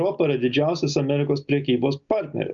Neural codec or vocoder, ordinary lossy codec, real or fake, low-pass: none; Opus, 64 kbps; real; 7.2 kHz